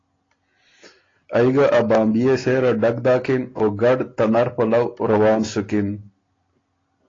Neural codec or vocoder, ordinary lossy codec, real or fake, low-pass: none; AAC, 32 kbps; real; 7.2 kHz